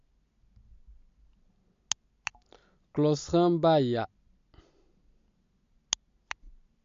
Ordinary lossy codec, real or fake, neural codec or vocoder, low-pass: MP3, 48 kbps; real; none; 7.2 kHz